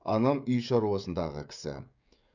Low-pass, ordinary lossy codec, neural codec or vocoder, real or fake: 7.2 kHz; none; codec, 16 kHz, 16 kbps, FreqCodec, smaller model; fake